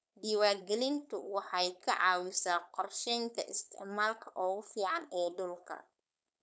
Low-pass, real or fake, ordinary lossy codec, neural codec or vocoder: none; fake; none; codec, 16 kHz, 4.8 kbps, FACodec